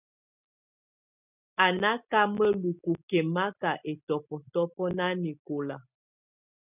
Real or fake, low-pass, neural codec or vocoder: real; 3.6 kHz; none